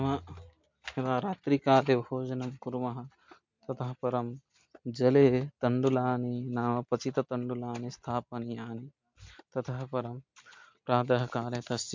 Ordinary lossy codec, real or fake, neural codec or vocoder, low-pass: MP3, 64 kbps; real; none; 7.2 kHz